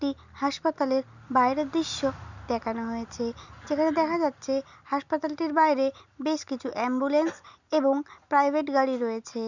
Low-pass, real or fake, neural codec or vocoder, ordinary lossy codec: 7.2 kHz; real; none; none